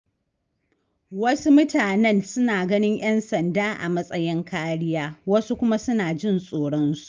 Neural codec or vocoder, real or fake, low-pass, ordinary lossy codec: none; real; 7.2 kHz; Opus, 24 kbps